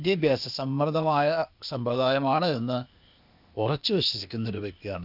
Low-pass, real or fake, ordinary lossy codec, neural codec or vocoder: 5.4 kHz; fake; none; codec, 16 kHz, 0.8 kbps, ZipCodec